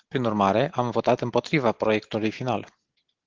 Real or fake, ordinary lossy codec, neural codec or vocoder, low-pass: real; Opus, 16 kbps; none; 7.2 kHz